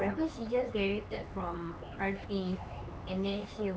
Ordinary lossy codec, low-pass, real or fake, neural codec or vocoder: none; none; fake; codec, 16 kHz, 4 kbps, X-Codec, HuBERT features, trained on LibriSpeech